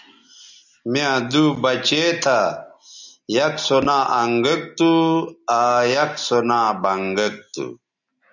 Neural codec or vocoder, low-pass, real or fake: none; 7.2 kHz; real